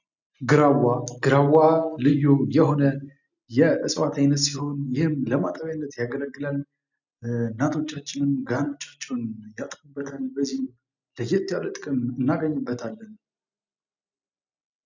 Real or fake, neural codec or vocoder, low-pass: real; none; 7.2 kHz